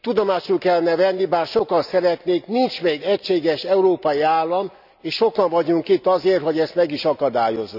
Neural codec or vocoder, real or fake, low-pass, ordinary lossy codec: none; real; 5.4 kHz; none